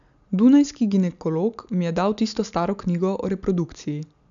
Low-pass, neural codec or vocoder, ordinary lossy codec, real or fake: 7.2 kHz; none; none; real